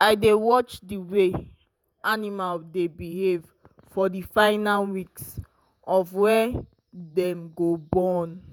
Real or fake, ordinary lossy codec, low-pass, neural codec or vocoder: fake; none; none; vocoder, 48 kHz, 128 mel bands, Vocos